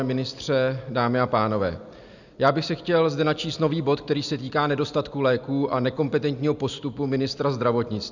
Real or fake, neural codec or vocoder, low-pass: real; none; 7.2 kHz